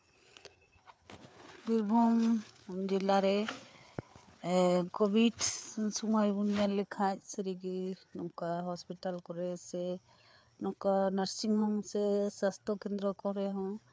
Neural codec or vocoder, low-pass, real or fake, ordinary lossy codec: codec, 16 kHz, 4 kbps, FreqCodec, larger model; none; fake; none